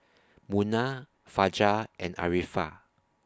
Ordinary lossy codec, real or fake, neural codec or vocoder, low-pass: none; real; none; none